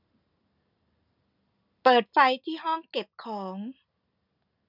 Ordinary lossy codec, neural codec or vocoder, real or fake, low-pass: none; none; real; 5.4 kHz